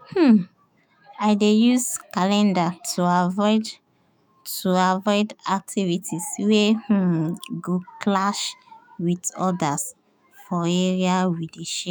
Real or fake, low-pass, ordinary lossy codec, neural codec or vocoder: fake; none; none; autoencoder, 48 kHz, 128 numbers a frame, DAC-VAE, trained on Japanese speech